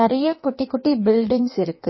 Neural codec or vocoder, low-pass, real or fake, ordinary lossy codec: codec, 16 kHz in and 24 kHz out, 1.1 kbps, FireRedTTS-2 codec; 7.2 kHz; fake; MP3, 24 kbps